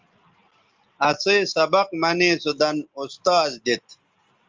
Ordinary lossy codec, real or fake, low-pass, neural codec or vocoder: Opus, 32 kbps; real; 7.2 kHz; none